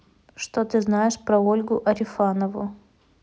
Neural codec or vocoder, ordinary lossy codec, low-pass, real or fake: none; none; none; real